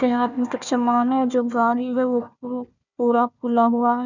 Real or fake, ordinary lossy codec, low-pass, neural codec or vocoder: fake; none; 7.2 kHz; codec, 16 kHz, 1 kbps, FunCodec, trained on Chinese and English, 50 frames a second